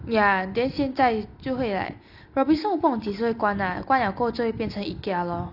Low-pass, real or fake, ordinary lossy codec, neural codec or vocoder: 5.4 kHz; real; none; none